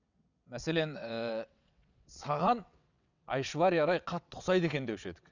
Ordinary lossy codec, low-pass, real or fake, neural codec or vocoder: none; 7.2 kHz; fake; vocoder, 22.05 kHz, 80 mel bands, WaveNeXt